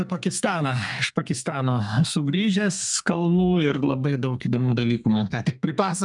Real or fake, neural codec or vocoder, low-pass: fake; codec, 32 kHz, 1.9 kbps, SNAC; 10.8 kHz